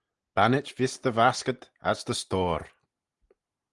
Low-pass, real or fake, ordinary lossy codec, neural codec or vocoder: 10.8 kHz; real; Opus, 24 kbps; none